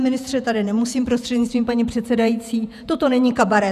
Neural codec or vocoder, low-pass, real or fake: vocoder, 48 kHz, 128 mel bands, Vocos; 14.4 kHz; fake